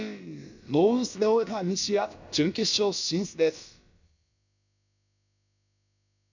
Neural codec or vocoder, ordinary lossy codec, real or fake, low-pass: codec, 16 kHz, about 1 kbps, DyCAST, with the encoder's durations; none; fake; 7.2 kHz